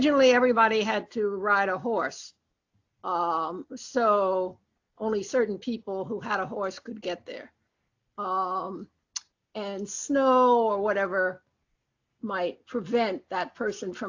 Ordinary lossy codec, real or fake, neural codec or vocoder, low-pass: AAC, 48 kbps; real; none; 7.2 kHz